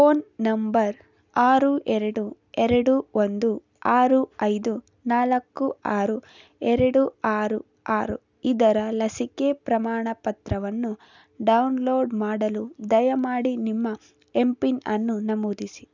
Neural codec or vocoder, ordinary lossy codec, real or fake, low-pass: none; none; real; 7.2 kHz